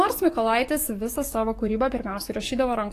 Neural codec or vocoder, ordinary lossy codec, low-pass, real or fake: codec, 44.1 kHz, 7.8 kbps, DAC; AAC, 48 kbps; 14.4 kHz; fake